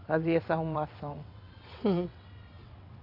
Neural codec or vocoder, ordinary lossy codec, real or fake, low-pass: vocoder, 22.05 kHz, 80 mel bands, WaveNeXt; AAC, 32 kbps; fake; 5.4 kHz